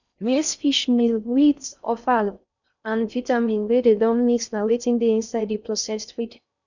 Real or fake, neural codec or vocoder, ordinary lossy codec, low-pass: fake; codec, 16 kHz in and 24 kHz out, 0.6 kbps, FocalCodec, streaming, 4096 codes; none; 7.2 kHz